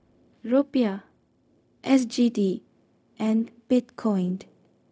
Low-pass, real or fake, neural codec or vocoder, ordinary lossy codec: none; fake; codec, 16 kHz, 0.4 kbps, LongCat-Audio-Codec; none